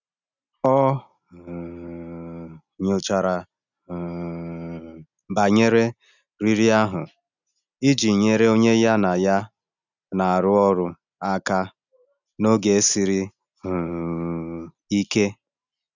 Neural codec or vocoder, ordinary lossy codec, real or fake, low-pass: none; none; real; 7.2 kHz